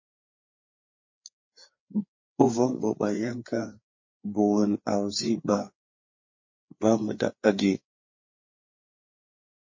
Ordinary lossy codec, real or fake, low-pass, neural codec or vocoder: MP3, 32 kbps; fake; 7.2 kHz; codec, 16 kHz, 4 kbps, FreqCodec, larger model